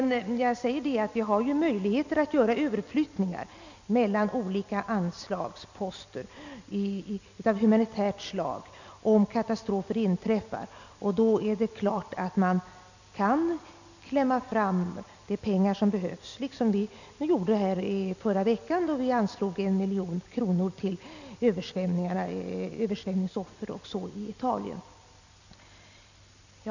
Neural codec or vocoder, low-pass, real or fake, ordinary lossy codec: none; 7.2 kHz; real; none